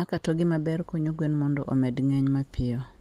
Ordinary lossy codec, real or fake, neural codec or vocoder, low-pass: Opus, 24 kbps; real; none; 14.4 kHz